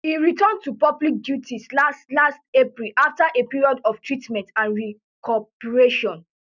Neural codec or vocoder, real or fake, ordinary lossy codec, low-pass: none; real; none; 7.2 kHz